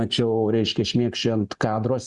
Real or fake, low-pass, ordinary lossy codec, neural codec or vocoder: real; 10.8 kHz; Opus, 64 kbps; none